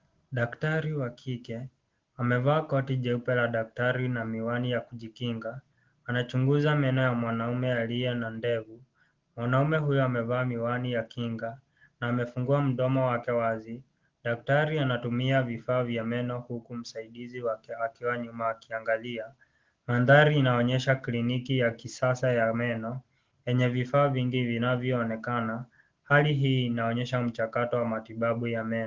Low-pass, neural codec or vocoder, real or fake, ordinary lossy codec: 7.2 kHz; none; real; Opus, 16 kbps